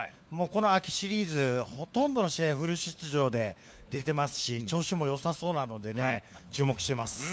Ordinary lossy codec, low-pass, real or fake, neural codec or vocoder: none; none; fake; codec, 16 kHz, 4 kbps, FunCodec, trained on LibriTTS, 50 frames a second